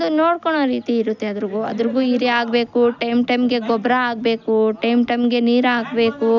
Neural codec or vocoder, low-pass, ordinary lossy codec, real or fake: none; 7.2 kHz; none; real